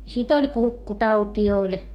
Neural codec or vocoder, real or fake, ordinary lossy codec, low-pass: codec, 44.1 kHz, 2.6 kbps, DAC; fake; none; 19.8 kHz